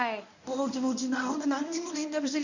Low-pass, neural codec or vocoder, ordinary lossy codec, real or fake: 7.2 kHz; codec, 24 kHz, 0.9 kbps, WavTokenizer, medium speech release version 1; none; fake